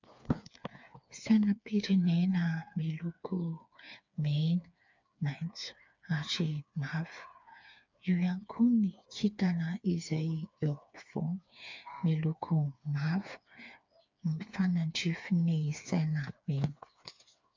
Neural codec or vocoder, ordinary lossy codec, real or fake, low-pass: codec, 16 kHz, 4 kbps, FreqCodec, smaller model; MP3, 64 kbps; fake; 7.2 kHz